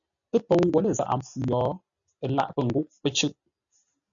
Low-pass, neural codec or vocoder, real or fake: 7.2 kHz; none; real